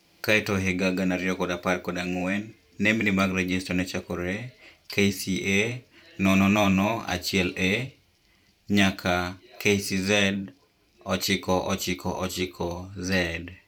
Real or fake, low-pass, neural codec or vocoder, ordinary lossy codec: fake; 19.8 kHz; vocoder, 44.1 kHz, 128 mel bands every 512 samples, BigVGAN v2; none